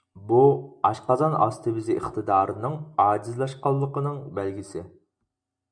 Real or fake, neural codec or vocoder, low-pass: real; none; 9.9 kHz